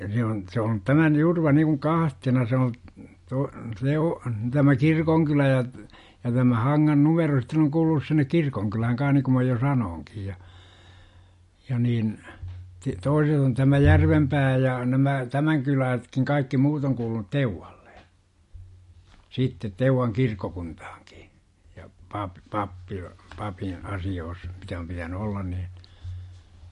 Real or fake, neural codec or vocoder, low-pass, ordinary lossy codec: real; none; 14.4 kHz; MP3, 48 kbps